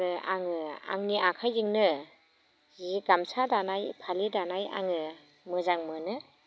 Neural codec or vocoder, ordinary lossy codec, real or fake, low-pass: none; none; real; none